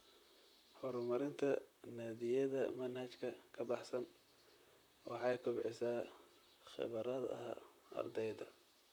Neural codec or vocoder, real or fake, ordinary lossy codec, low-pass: vocoder, 44.1 kHz, 128 mel bands, Pupu-Vocoder; fake; none; none